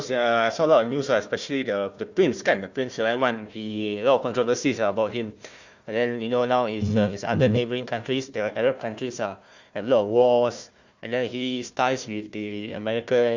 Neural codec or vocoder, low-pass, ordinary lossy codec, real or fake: codec, 16 kHz, 1 kbps, FunCodec, trained on Chinese and English, 50 frames a second; 7.2 kHz; Opus, 64 kbps; fake